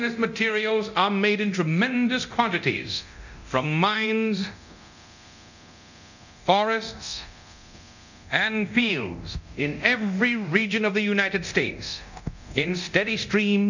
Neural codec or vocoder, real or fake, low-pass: codec, 24 kHz, 0.9 kbps, DualCodec; fake; 7.2 kHz